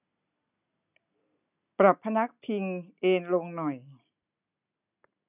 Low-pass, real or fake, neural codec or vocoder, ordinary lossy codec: 3.6 kHz; real; none; none